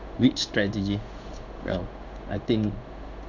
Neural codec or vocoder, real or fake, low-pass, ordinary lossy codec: codec, 16 kHz in and 24 kHz out, 1 kbps, XY-Tokenizer; fake; 7.2 kHz; none